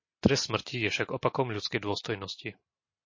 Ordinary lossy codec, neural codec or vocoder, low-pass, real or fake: MP3, 32 kbps; none; 7.2 kHz; real